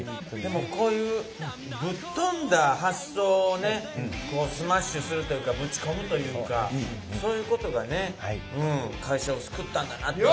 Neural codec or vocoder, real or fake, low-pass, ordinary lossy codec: none; real; none; none